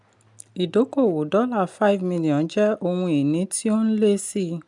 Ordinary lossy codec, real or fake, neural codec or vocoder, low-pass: none; real; none; 10.8 kHz